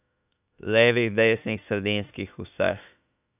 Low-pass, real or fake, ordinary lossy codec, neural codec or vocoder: 3.6 kHz; fake; none; autoencoder, 48 kHz, 32 numbers a frame, DAC-VAE, trained on Japanese speech